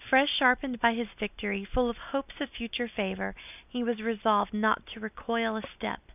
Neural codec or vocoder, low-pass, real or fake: none; 3.6 kHz; real